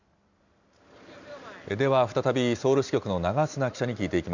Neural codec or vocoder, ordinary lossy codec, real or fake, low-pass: none; none; real; 7.2 kHz